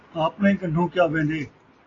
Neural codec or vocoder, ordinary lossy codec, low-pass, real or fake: none; AAC, 32 kbps; 7.2 kHz; real